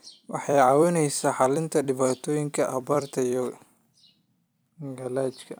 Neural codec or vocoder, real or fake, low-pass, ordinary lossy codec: vocoder, 44.1 kHz, 128 mel bands every 256 samples, BigVGAN v2; fake; none; none